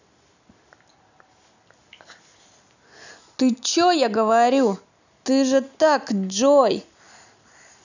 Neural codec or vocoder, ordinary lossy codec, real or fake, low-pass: none; none; real; 7.2 kHz